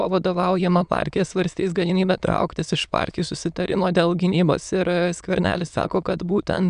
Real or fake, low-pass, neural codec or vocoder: fake; 9.9 kHz; autoencoder, 22.05 kHz, a latent of 192 numbers a frame, VITS, trained on many speakers